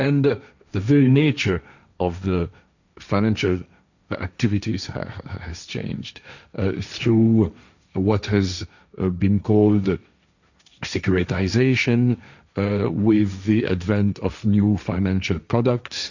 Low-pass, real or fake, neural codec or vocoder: 7.2 kHz; fake; codec, 16 kHz, 1.1 kbps, Voila-Tokenizer